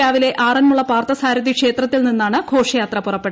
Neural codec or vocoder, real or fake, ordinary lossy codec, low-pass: none; real; none; none